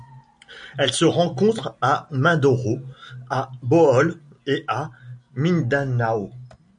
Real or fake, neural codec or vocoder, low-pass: real; none; 9.9 kHz